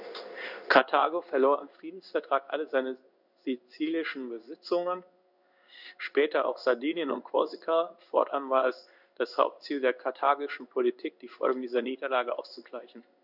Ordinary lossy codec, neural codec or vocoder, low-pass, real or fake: none; codec, 16 kHz in and 24 kHz out, 1 kbps, XY-Tokenizer; 5.4 kHz; fake